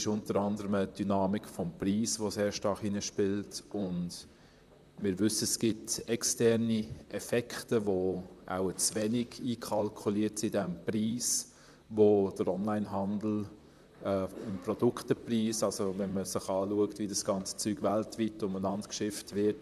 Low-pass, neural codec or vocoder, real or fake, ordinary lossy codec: 14.4 kHz; vocoder, 44.1 kHz, 128 mel bands, Pupu-Vocoder; fake; none